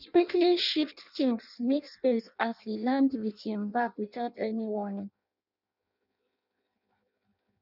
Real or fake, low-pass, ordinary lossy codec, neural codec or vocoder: fake; 5.4 kHz; none; codec, 16 kHz in and 24 kHz out, 0.6 kbps, FireRedTTS-2 codec